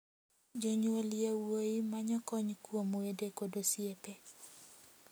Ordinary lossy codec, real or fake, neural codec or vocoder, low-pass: none; real; none; none